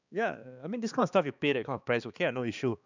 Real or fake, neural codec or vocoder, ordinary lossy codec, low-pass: fake; codec, 16 kHz, 2 kbps, X-Codec, HuBERT features, trained on balanced general audio; none; 7.2 kHz